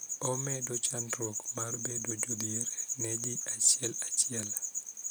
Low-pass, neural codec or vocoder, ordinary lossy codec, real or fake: none; none; none; real